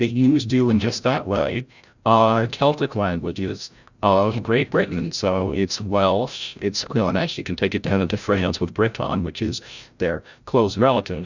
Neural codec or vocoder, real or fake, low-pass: codec, 16 kHz, 0.5 kbps, FreqCodec, larger model; fake; 7.2 kHz